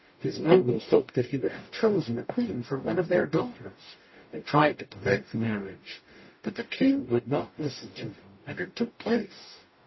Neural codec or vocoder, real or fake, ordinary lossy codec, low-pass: codec, 44.1 kHz, 0.9 kbps, DAC; fake; MP3, 24 kbps; 7.2 kHz